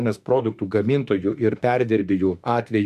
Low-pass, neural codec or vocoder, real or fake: 14.4 kHz; autoencoder, 48 kHz, 32 numbers a frame, DAC-VAE, trained on Japanese speech; fake